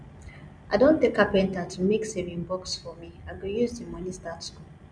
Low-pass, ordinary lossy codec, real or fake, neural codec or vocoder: 9.9 kHz; none; real; none